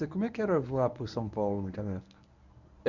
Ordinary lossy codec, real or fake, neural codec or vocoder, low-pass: none; fake; codec, 24 kHz, 0.9 kbps, WavTokenizer, medium speech release version 1; 7.2 kHz